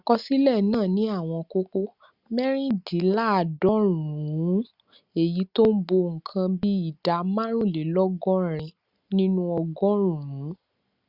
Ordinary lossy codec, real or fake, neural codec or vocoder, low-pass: Opus, 64 kbps; real; none; 5.4 kHz